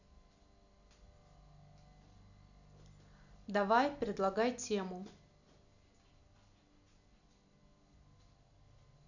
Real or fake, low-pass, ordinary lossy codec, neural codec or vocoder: real; 7.2 kHz; none; none